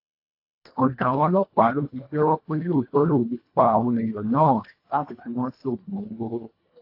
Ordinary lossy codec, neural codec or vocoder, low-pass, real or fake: AAC, 32 kbps; codec, 24 kHz, 1.5 kbps, HILCodec; 5.4 kHz; fake